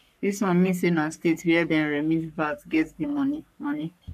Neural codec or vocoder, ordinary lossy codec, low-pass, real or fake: codec, 44.1 kHz, 3.4 kbps, Pupu-Codec; none; 14.4 kHz; fake